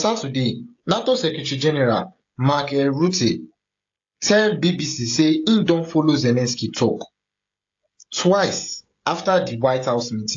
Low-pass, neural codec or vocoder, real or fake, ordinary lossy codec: 7.2 kHz; codec, 16 kHz, 16 kbps, FreqCodec, smaller model; fake; AAC, 48 kbps